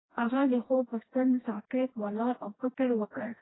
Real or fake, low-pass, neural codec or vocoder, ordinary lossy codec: fake; 7.2 kHz; codec, 16 kHz, 1 kbps, FreqCodec, smaller model; AAC, 16 kbps